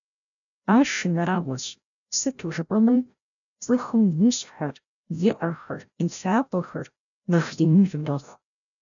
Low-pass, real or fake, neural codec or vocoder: 7.2 kHz; fake; codec, 16 kHz, 0.5 kbps, FreqCodec, larger model